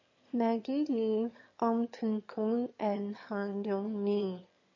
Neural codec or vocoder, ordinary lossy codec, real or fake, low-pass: autoencoder, 22.05 kHz, a latent of 192 numbers a frame, VITS, trained on one speaker; MP3, 32 kbps; fake; 7.2 kHz